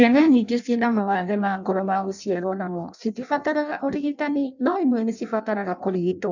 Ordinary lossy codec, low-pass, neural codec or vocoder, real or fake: none; 7.2 kHz; codec, 16 kHz in and 24 kHz out, 0.6 kbps, FireRedTTS-2 codec; fake